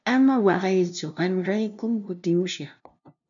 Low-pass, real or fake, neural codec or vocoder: 7.2 kHz; fake; codec, 16 kHz, 0.5 kbps, FunCodec, trained on LibriTTS, 25 frames a second